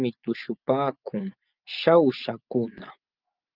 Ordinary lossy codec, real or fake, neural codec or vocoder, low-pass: Opus, 24 kbps; fake; vocoder, 24 kHz, 100 mel bands, Vocos; 5.4 kHz